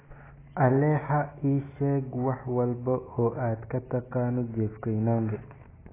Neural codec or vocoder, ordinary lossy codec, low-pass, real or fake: none; AAC, 16 kbps; 3.6 kHz; real